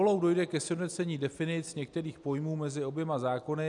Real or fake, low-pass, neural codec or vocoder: real; 10.8 kHz; none